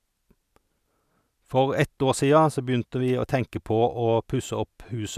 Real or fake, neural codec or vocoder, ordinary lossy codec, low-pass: real; none; none; 14.4 kHz